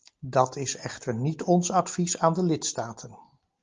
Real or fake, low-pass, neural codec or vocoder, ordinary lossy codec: real; 7.2 kHz; none; Opus, 32 kbps